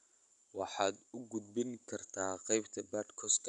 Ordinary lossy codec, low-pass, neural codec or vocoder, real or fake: none; 10.8 kHz; none; real